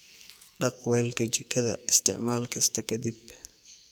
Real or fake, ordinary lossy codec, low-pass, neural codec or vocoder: fake; none; none; codec, 44.1 kHz, 2.6 kbps, SNAC